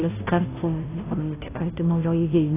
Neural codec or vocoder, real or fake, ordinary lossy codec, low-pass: codec, 16 kHz, 0.5 kbps, FunCodec, trained on Chinese and English, 25 frames a second; fake; AAC, 16 kbps; 3.6 kHz